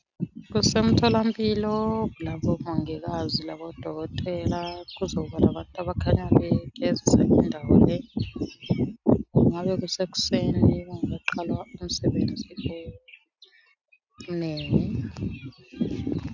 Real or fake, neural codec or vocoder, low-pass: real; none; 7.2 kHz